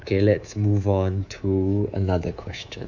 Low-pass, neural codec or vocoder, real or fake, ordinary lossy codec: 7.2 kHz; codec, 24 kHz, 3.1 kbps, DualCodec; fake; none